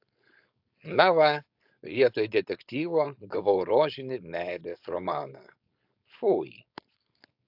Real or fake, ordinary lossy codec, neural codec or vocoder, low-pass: fake; AAC, 48 kbps; codec, 16 kHz, 4.8 kbps, FACodec; 5.4 kHz